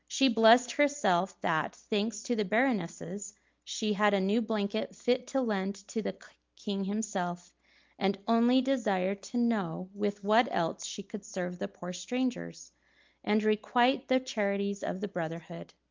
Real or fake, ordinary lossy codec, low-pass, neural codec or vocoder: real; Opus, 24 kbps; 7.2 kHz; none